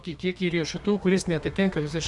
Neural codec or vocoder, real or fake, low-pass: codec, 32 kHz, 1.9 kbps, SNAC; fake; 10.8 kHz